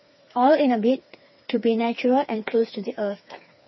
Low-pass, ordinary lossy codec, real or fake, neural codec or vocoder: 7.2 kHz; MP3, 24 kbps; fake; codec, 16 kHz, 4 kbps, FreqCodec, smaller model